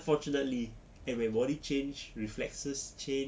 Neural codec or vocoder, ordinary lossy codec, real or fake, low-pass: none; none; real; none